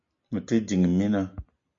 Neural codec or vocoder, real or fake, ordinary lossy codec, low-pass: none; real; MP3, 48 kbps; 7.2 kHz